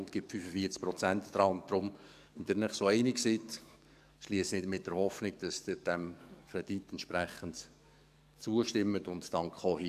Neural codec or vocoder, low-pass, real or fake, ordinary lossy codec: codec, 44.1 kHz, 7.8 kbps, DAC; 14.4 kHz; fake; none